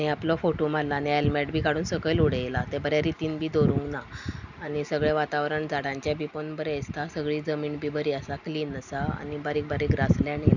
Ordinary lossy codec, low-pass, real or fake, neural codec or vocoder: none; 7.2 kHz; real; none